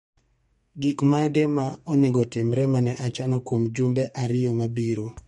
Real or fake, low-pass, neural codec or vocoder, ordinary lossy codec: fake; 14.4 kHz; codec, 32 kHz, 1.9 kbps, SNAC; MP3, 48 kbps